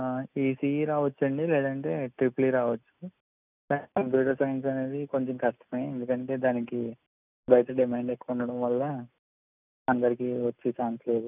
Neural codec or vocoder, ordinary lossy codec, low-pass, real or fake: none; none; 3.6 kHz; real